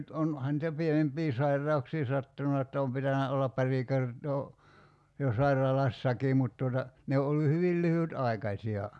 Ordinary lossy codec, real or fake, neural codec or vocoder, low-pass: none; real; none; none